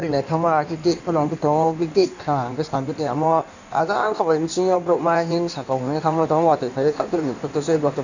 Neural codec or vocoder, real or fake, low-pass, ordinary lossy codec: codec, 16 kHz in and 24 kHz out, 1.1 kbps, FireRedTTS-2 codec; fake; 7.2 kHz; none